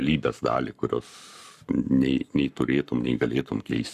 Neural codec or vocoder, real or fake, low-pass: codec, 44.1 kHz, 7.8 kbps, Pupu-Codec; fake; 14.4 kHz